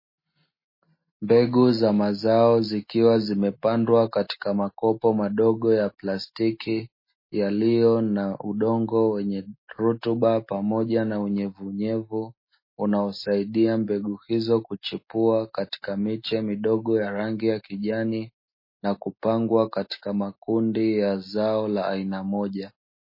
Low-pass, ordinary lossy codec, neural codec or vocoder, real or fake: 5.4 kHz; MP3, 24 kbps; none; real